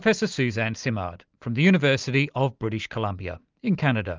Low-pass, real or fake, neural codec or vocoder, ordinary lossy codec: 7.2 kHz; real; none; Opus, 32 kbps